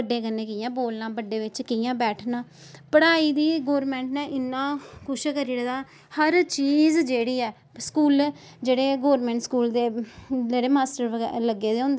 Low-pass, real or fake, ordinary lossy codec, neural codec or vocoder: none; real; none; none